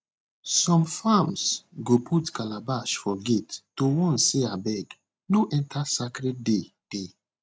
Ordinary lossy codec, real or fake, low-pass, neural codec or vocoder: none; real; none; none